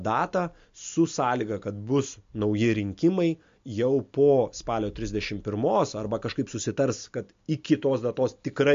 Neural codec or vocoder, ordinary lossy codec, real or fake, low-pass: none; MP3, 48 kbps; real; 7.2 kHz